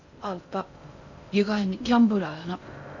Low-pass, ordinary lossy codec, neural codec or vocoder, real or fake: 7.2 kHz; none; codec, 16 kHz in and 24 kHz out, 0.6 kbps, FocalCodec, streaming, 2048 codes; fake